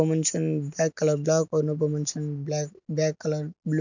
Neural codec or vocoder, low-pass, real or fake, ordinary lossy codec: none; 7.2 kHz; real; none